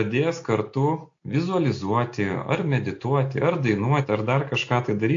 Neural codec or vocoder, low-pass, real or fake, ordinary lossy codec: none; 7.2 kHz; real; AAC, 48 kbps